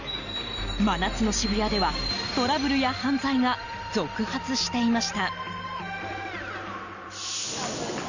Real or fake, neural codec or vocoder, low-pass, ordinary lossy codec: real; none; 7.2 kHz; none